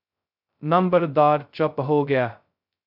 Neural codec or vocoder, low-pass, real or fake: codec, 16 kHz, 0.2 kbps, FocalCodec; 5.4 kHz; fake